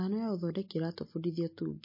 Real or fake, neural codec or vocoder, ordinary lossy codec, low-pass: real; none; MP3, 24 kbps; 5.4 kHz